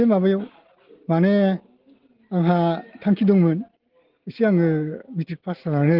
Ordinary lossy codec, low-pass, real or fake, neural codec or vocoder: Opus, 16 kbps; 5.4 kHz; real; none